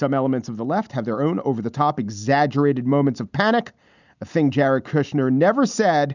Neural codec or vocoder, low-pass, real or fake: none; 7.2 kHz; real